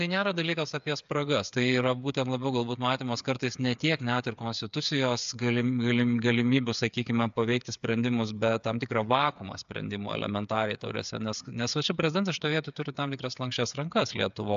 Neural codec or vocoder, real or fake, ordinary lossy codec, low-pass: codec, 16 kHz, 8 kbps, FreqCodec, smaller model; fake; AAC, 96 kbps; 7.2 kHz